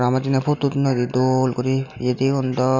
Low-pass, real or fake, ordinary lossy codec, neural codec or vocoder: 7.2 kHz; real; none; none